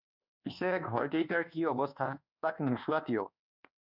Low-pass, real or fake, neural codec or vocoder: 5.4 kHz; fake; codec, 24 kHz, 1.2 kbps, DualCodec